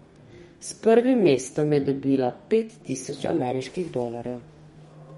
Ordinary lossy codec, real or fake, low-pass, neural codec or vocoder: MP3, 48 kbps; fake; 14.4 kHz; codec, 32 kHz, 1.9 kbps, SNAC